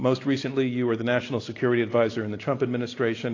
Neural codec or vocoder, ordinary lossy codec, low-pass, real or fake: none; AAC, 32 kbps; 7.2 kHz; real